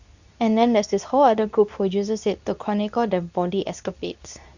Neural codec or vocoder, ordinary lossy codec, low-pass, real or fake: codec, 24 kHz, 0.9 kbps, WavTokenizer, medium speech release version 2; none; 7.2 kHz; fake